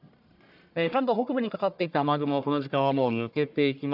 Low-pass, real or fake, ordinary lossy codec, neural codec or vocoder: 5.4 kHz; fake; none; codec, 44.1 kHz, 1.7 kbps, Pupu-Codec